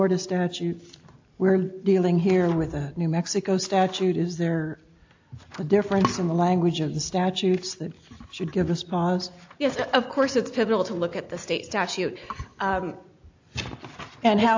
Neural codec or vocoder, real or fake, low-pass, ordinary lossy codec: none; real; 7.2 kHz; AAC, 48 kbps